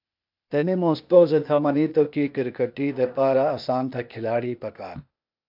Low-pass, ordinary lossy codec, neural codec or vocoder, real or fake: 5.4 kHz; MP3, 48 kbps; codec, 16 kHz, 0.8 kbps, ZipCodec; fake